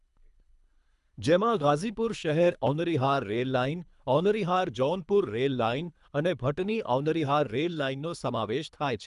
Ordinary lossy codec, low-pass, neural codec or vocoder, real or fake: none; 10.8 kHz; codec, 24 kHz, 3 kbps, HILCodec; fake